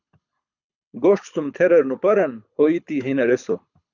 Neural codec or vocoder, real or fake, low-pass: codec, 24 kHz, 6 kbps, HILCodec; fake; 7.2 kHz